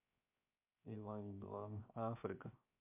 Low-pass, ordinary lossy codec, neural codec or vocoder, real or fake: 3.6 kHz; MP3, 32 kbps; codec, 16 kHz, 0.7 kbps, FocalCodec; fake